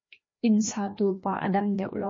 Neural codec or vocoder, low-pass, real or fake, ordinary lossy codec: codec, 16 kHz, 1 kbps, FreqCodec, larger model; 7.2 kHz; fake; MP3, 32 kbps